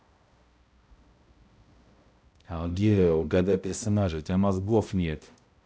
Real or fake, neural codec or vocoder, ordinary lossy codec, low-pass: fake; codec, 16 kHz, 0.5 kbps, X-Codec, HuBERT features, trained on balanced general audio; none; none